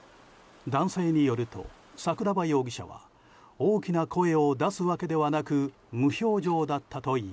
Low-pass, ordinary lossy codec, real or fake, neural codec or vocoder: none; none; real; none